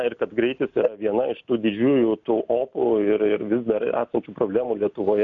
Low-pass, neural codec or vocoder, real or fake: 7.2 kHz; none; real